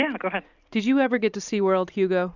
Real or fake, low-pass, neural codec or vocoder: fake; 7.2 kHz; vocoder, 44.1 kHz, 128 mel bands every 256 samples, BigVGAN v2